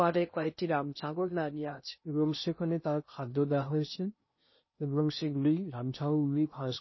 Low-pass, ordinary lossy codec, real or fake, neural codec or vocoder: 7.2 kHz; MP3, 24 kbps; fake; codec, 16 kHz in and 24 kHz out, 0.6 kbps, FocalCodec, streaming, 2048 codes